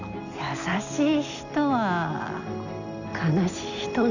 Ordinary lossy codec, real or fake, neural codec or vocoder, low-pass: none; real; none; 7.2 kHz